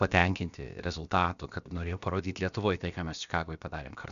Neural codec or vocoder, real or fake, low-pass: codec, 16 kHz, about 1 kbps, DyCAST, with the encoder's durations; fake; 7.2 kHz